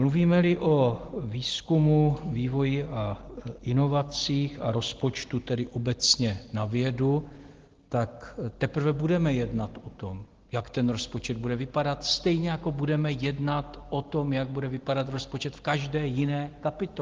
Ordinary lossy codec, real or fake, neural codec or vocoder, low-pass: Opus, 16 kbps; real; none; 7.2 kHz